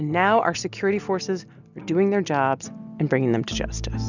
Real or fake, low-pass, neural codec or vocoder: real; 7.2 kHz; none